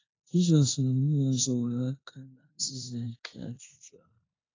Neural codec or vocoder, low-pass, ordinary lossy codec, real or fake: codec, 16 kHz in and 24 kHz out, 0.9 kbps, LongCat-Audio-Codec, four codebook decoder; 7.2 kHz; AAC, 32 kbps; fake